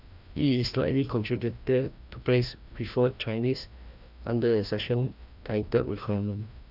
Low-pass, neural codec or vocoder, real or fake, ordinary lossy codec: 5.4 kHz; codec, 16 kHz, 1 kbps, FreqCodec, larger model; fake; none